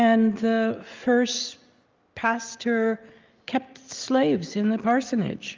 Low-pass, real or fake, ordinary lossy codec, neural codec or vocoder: 7.2 kHz; real; Opus, 32 kbps; none